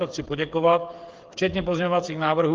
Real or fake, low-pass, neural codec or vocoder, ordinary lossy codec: fake; 7.2 kHz; codec, 16 kHz, 8 kbps, FreqCodec, smaller model; Opus, 24 kbps